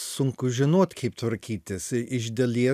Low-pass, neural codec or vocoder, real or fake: 14.4 kHz; none; real